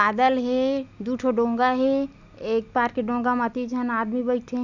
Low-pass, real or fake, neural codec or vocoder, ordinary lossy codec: 7.2 kHz; real; none; none